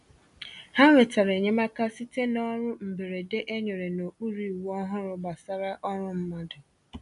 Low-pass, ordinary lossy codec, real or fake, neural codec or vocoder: 10.8 kHz; none; real; none